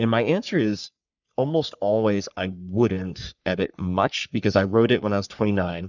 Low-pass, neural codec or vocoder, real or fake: 7.2 kHz; codec, 44.1 kHz, 3.4 kbps, Pupu-Codec; fake